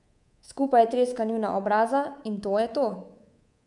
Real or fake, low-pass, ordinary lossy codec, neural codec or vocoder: fake; 10.8 kHz; none; codec, 24 kHz, 3.1 kbps, DualCodec